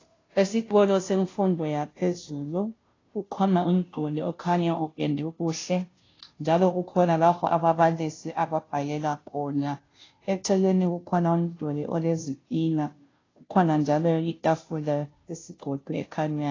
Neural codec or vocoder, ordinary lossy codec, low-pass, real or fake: codec, 16 kHz, 0.5 kbps, FunCodec, trained on Chinese and English, 25 frames a second; AAC, 32 kbps; 7.2 kHz; fake